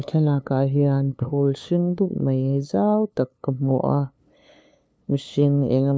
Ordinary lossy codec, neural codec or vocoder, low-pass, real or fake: none; codec, 16 kHz, 2 kbps, FunCodec, trained on LibriTTS, 25 frames a second; none; fake